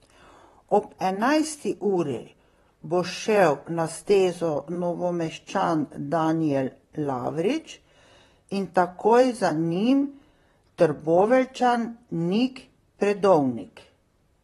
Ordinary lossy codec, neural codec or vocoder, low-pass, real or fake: AAC, 32 kbps; vocoder, 44.1 kHz, 128 mel bands, Pupu-Vocoder; 19.8 kHz; fake